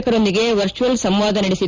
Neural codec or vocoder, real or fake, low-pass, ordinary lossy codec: none; real; 7.2 kHz; Opus, 32 kbps